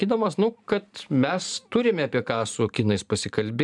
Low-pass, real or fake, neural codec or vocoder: 10.8 kHz; fake; vocoder, 44.1 kHz, 128 mel bands every 512 samples, BigVGAN v2